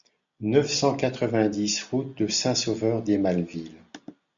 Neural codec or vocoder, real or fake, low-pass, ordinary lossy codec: none; real; 7.2 kHz; MP3, 64 kbps